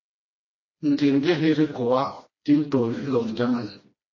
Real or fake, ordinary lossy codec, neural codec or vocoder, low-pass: fake; MP3, 32 kbps; codec, 16 kHz, 1 kbps, FreqCodec, smaller model; 7.2 kHz